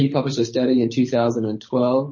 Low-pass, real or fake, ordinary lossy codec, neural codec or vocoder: 7.2 kHz; fake; MP3, 32 kbps; codec, 24 kHz, 6 kbps, HILCodec